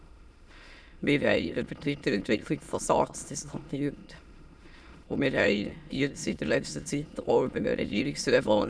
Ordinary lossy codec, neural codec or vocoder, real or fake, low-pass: none; autoencoder, 22.05 kHz, a latent of 192 numbers a frame, VITS, trained on many speakers; fake; none